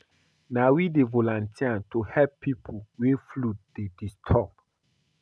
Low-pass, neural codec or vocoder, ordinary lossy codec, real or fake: none; none; none; real